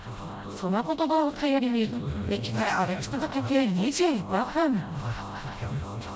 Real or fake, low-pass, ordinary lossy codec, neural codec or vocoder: fake; none; none; codec, 16 kHz, 0.5 kbps, FreqCodec, smaller model